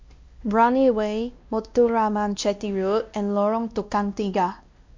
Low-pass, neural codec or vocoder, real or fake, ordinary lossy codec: 7.2 kHz; codec, 16 kHz, 1 kbps, X-Codec, WavLM features, trained on Multilingual LibriSpeech; fake; MP3, 48 kbps